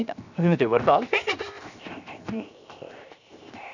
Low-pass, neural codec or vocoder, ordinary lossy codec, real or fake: 7.2 kHz; codec, 16 kHz, 0.7 kbps, FocalCodec; none; fake